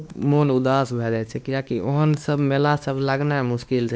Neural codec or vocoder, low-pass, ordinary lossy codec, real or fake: codec, 16 kHz, 2 kbps, X-Codec, WavLM features, trained on Multilingual LibriSpeech; none; none; fake